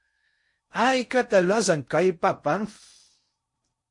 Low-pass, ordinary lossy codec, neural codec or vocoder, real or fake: 10.8 kHz; MP3, 48 kbps; codec, 16 kHz in and 24 kHz out, 0.6 kbps, FocalCodec, streaming, 4096 codes; fake